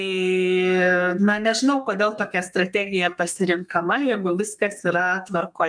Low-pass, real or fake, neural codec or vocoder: 9.9 kHz; fake; codec, 32 kHz, 1.9 kbps, SNAC